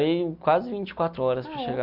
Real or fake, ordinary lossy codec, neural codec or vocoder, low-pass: real; none; none; 5.4 kHz